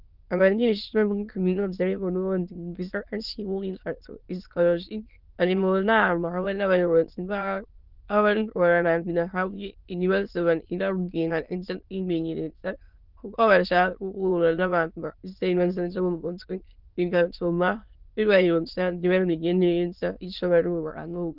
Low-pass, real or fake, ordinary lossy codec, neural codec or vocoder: 5.4 kHz; fake; Opus, 24 kbps; autoencoder, 22.05 kHz, a latent of 192 numbers a frame, VITS, trained on many speakers